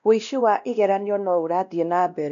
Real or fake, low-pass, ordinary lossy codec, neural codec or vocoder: fake; 7.2 kHz; none; codec, 16 kHz, 1 kbps, X-Codec, WavLM features, trained on Multilingual LibriSpeech